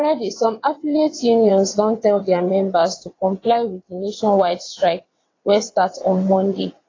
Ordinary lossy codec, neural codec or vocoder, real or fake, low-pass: AAC, 32 kbps; vocoder, 22.05 kHz, 80 mel bands, WaveNeXt; fake; 7.2 kHz